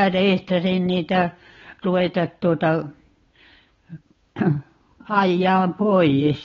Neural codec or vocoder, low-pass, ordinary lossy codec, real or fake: codec, 16 kHz, 8 kbps, FunCodec, trained on Chinese and English, 25 frames a second; 7.2 kHz; AAC, 24 kbps; fake